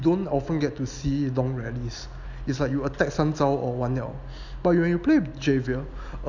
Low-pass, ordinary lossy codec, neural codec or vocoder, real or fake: 7.2 kHz; none; none; real